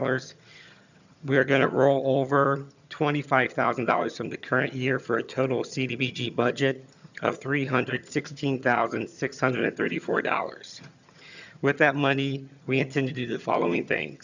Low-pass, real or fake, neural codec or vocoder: 7.2 kHz; fake; vocoder, 22.05 kHz, 80 mel bands, HiFi-GAN